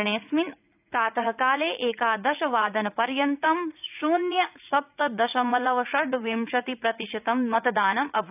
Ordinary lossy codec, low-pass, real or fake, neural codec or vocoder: none; 3.6 kHz; fake; vocoder, 44.1 kHz, 80 mel bands, Vocos